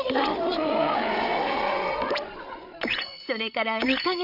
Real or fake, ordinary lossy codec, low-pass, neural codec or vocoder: fake; none; 5.4 kHz; codec, 16 kHz, 8 kbps, FreqCodec, larger model